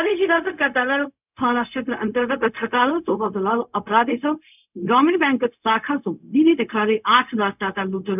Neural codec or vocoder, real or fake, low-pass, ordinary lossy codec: codec, 16 kHz, 0.4 kbps, LongCat-Audio-Codec; fake; 3.6 kHz; none